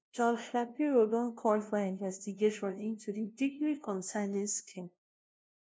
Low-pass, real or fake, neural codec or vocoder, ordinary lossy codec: none; fake; codec, 16 kHz, 0.5 kbps, FunCodec, trained on LibriTTS, 25 frames a second; none